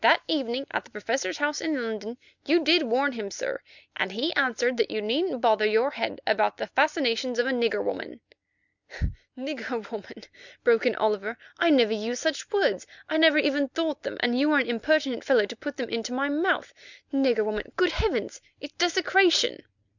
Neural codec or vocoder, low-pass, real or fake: none; 7.2 kHz; real